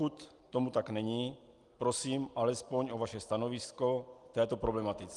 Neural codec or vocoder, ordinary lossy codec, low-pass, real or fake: none; Opus, 24 kbps; 10.8 kHz; real